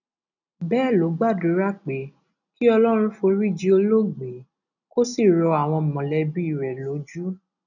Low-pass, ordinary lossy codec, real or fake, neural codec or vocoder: 7.2 kHz; none; real; none